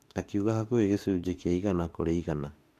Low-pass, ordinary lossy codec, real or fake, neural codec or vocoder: 14.4 kHz; AAC, 64 kbps; fake; autoencoder, 48 kHz, 32 numbers a frame, DAC-VAE, trained on Japanese speech